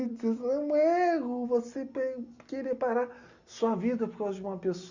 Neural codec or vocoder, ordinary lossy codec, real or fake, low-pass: none; Opus, 64 kbps; real; 7.2 kHz